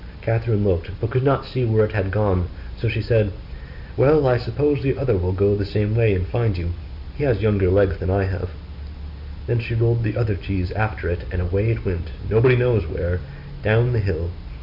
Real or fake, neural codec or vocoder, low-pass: real; none; 5.4 kHz